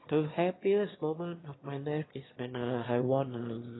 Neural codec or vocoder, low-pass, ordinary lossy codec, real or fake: autoencoder, 22.05 kHz, a latent of 192 numbers a frame, VITS, trained on one speaker; 7.2 kHz; AAC, 16 kbps; fake